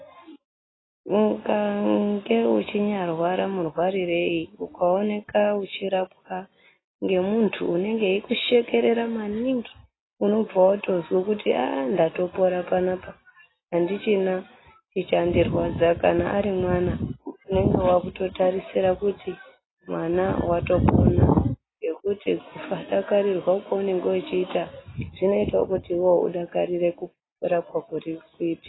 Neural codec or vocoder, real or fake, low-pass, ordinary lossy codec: none; real; 7.2 kHz; AAC, 16 kbps